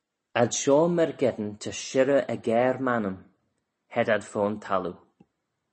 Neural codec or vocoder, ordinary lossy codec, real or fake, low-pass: none; MP3, 32 kbps; real; 10.8 kHz